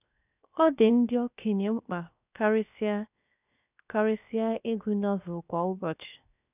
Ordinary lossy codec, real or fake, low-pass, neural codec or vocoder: none; fake; 3.6 kHz; codec, 16 kHz, 0.7 kbps, FocalCodec